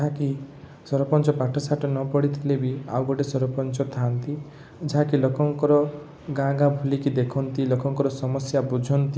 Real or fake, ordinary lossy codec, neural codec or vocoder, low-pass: real; none; none; none